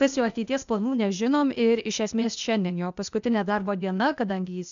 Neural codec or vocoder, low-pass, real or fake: codec, 16 kHz, 0.8 kbps, ZipCodec; 7.2 kHz; fake